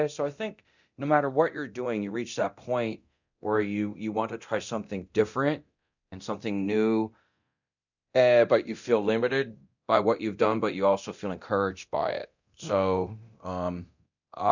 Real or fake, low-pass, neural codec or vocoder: fake; 7.2 kHz; codec, 24 kHz, 0.9 kbps, DualCodec